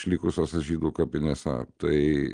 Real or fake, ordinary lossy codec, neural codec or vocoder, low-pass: real; Opus, 24 kbps; none; 9.9 kHz